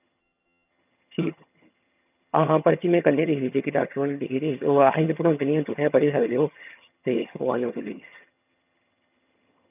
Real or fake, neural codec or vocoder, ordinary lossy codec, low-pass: fake; vocoder, 22.05 kHz, 80 mel bands, HiFi-GAN; none; 3.6 kHz